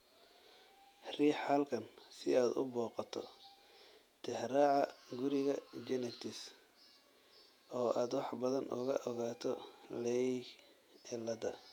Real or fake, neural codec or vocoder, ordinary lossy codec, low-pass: fake; vocoder, 48 kHz, 128 mel bands, Vocos; none; 19.8 kHz